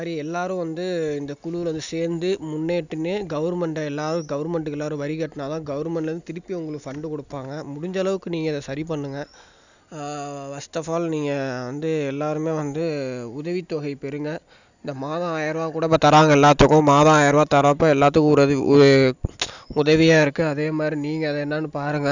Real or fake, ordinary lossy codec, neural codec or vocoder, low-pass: real; none; none; 7.2 kHz